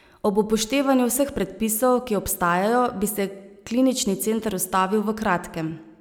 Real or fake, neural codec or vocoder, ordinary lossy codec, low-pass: real; none; none; none